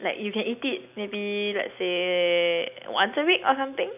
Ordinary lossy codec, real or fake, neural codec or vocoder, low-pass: none; real; none; 3.6 kHz